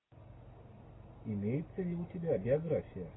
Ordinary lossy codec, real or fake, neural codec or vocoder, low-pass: AAC, 16 kbps; real; none; 7.2 kHz